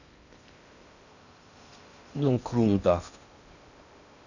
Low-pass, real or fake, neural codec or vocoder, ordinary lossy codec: 7.2 kHz; fake; codec, 16 kHz in and 24 kHz out, 0.6 kbps, FocalCodec, streaming, 2048 codes; none